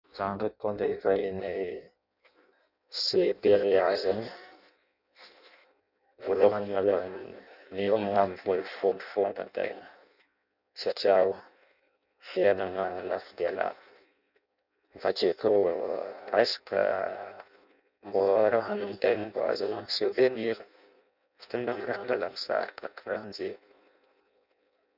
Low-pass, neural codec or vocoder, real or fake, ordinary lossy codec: 5.4 kHz; codec, 16 kHz in and 24 kHz out, 0.6 kbps, FireRedTTS-2 codec; fake; none